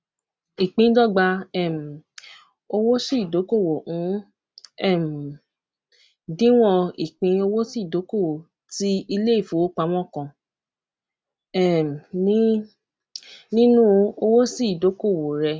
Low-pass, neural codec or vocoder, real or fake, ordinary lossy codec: none; none; real; none